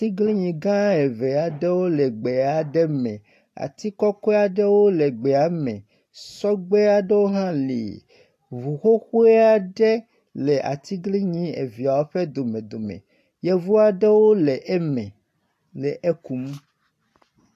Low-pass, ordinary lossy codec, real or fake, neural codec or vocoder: 14.4 kHz; AAC, 64 kbps; real; none